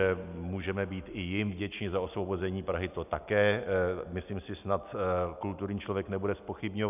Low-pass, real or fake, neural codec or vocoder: 3.6 kHz; real; none